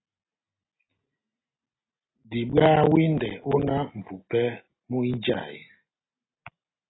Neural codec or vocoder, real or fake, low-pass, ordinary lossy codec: none; real; 7.2 kHz; AAC, 16 kbps